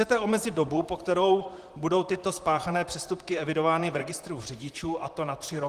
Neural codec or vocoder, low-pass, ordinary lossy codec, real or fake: vocoder, 44.1 kHz, 128 mel bands, Pupu-Vocoder; 14.4 kHz; Opus, 24 kbps; fake